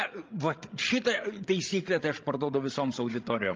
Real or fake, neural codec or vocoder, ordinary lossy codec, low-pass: fake; codec, 16 kHz, 16 kbps, FreqCodec, larger model; Opus, 32 kbps; 7.2 kHz